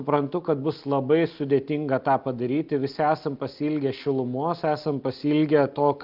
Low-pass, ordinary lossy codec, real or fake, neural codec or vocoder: 5.4 kHz; Opus, 24 kbps; real; none